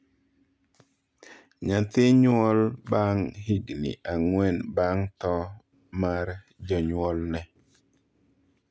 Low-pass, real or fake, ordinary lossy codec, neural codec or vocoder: none; real; none; none